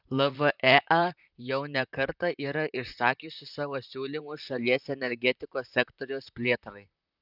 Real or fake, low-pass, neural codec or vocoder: fake; 5.4 kHz; vocoder, 44.1 kHz, 128 mel bands, Pupu-Vocoder